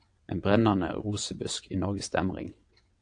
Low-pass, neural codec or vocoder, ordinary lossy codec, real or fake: 9.9 kHz; vocoder, 22.05 kHz, 80 mel bands, WaveNeXt; AAC, 48 kbps; fake